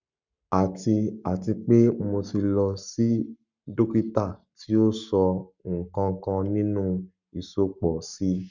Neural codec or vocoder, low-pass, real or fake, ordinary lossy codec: codec, 44.1 kHz, 7.8 kbps, Pupu-Codec; 7.2 kHz; fake; none